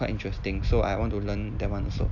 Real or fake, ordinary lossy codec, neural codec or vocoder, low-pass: real; none; none; 7.2 kHz